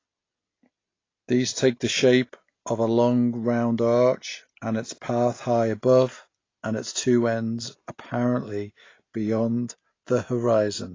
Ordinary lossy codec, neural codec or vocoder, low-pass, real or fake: AAC, 32 kbps; none; 7.2 kHz; real